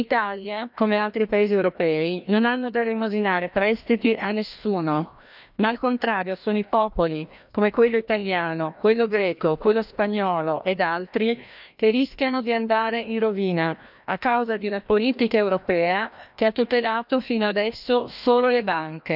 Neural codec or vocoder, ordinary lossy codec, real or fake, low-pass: codec, 16 kHz, 1 kbps, FreqCodec, larger model; none; fake; 5.4 kHz